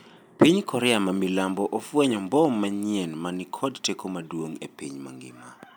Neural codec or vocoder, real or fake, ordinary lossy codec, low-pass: none; real; none; none